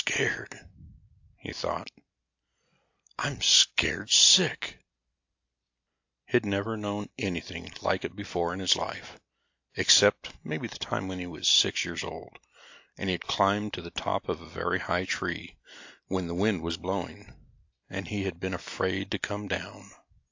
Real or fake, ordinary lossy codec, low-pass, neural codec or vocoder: real; AAC, 48 kbps; 7.2 kHz; none